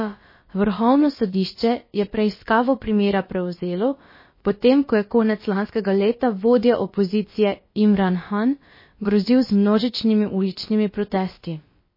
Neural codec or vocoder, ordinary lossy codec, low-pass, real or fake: codec, 16 kHz, about 1 kbps, DyCAST, with the encoder's durations; MP3, 24 kbps; 5.4 kHz; fake